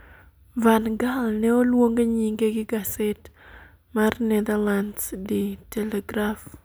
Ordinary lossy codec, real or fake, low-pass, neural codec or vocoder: none; real; none; none